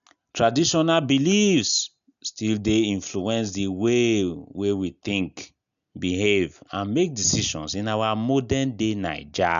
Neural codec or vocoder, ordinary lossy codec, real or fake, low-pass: none; none; real; 7.2 kHz